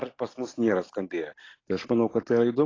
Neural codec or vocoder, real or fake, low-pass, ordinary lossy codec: none; real; 7.2 kHz; AAC, 32 kbps